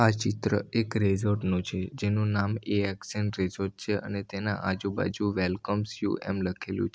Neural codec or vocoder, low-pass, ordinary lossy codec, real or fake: none; none; none; real